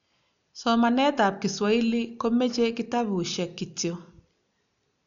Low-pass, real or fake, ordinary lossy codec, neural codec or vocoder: 7.2 kHz; real; none; none